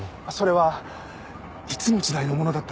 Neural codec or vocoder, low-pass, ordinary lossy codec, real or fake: none; none; none; real